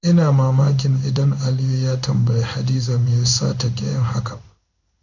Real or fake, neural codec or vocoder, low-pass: fake; codec, 16 kHz in and 24 kHz out, 1 kbps, XY-Tokenizer; 7.2 kHz